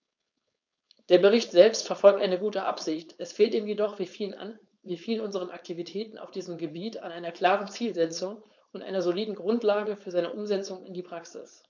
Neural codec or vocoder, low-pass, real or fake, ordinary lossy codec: codec, 16 kHz, 4.8 kbps, FACodec; 7.2 kHz; fake; none